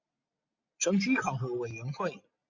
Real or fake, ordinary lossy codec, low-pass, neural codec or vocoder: real; MP3, 48 kbps; 7.2 kHz; none